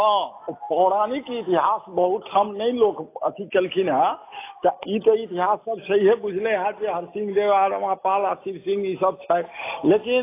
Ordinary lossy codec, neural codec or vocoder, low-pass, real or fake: AAC, 24 kbps; none; 3.6 kHz; real